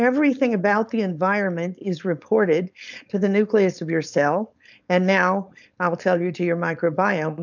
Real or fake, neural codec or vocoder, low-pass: fake; codec, 16 kHz, 4.8 kbps, FACodec; 7.2 kHz